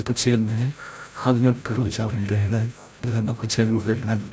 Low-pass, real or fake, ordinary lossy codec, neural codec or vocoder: none; fake; none; codec, 16 kHz, 0.5 kbps, FreqCodec, larger model